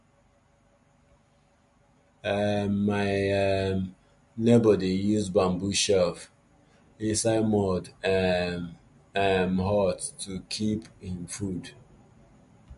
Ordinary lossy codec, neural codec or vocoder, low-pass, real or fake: MP3, 48 kbps; none; 14.4 kHz; real